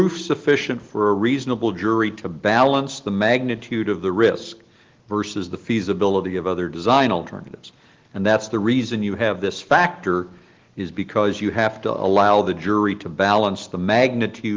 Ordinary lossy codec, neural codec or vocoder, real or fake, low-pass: Opus, 16 kbps; none; real; 7.2 kHz